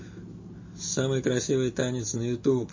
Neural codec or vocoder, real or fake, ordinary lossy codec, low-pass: none; real; MP3, 32 kbps; 7.2 kHz